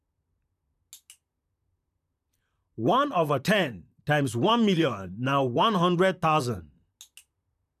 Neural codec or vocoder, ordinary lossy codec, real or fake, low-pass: vocoder, 44.1 kHz, 128 mel bands, Pupu-Vocoder; none; fake; 14.4 kHz